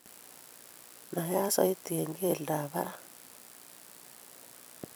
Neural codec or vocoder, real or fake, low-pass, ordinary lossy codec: vocoder, 44.1 kHz, 128 mel bands every 256 samples, BigVGAN v2; fake; none; none